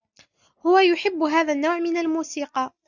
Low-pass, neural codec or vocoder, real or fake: 7.2 kHz; none; real